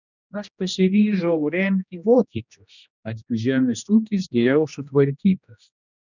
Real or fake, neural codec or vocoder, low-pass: fake; codec, 16 kHz, 1 kbps, X-Codec, HuBERT features, trained on general audio; 7.2 kHz